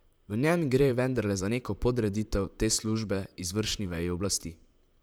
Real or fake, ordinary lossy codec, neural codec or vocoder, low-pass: fake; none; vocoder, 44.1 kHz, 128 mel bands, Pupu-Vocoder; none